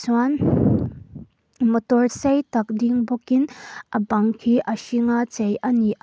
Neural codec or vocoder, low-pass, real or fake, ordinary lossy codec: none; none; real; none